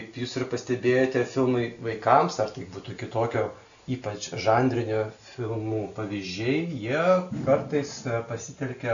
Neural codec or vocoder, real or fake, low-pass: none; real; 7.2 kHz